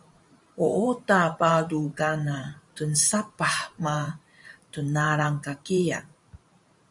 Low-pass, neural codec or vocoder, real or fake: 10.8 kHz; vocoder, 44.1 kHz, 128 mel bands every 512 samples, BigVGAN v2; fake